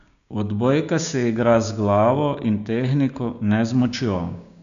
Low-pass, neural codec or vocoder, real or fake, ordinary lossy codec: 7.2 kHz; codec, 16 kHz, 6 kbps, DAC; fake; none